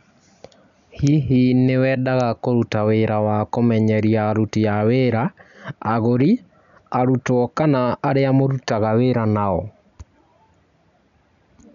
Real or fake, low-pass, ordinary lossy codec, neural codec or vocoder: real; 7.2 kHz; none; none